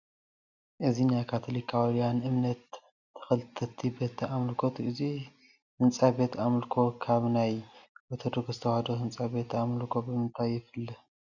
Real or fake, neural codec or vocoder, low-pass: real; none; 7.2 kHz